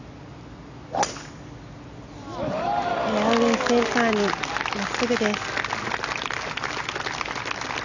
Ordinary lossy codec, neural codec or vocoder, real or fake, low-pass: none; none; real; 7.2 kHz